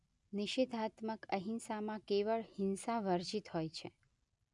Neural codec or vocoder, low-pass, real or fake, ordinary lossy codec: none; 10.8 kHz; real; none